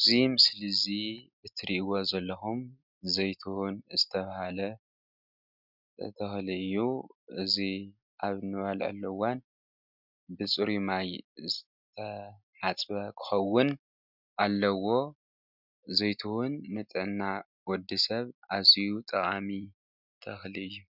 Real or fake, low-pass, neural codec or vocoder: real; 5.4 kHz; none